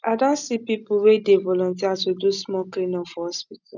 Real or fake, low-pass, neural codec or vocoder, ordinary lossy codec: real; 7.2 kHz; none; none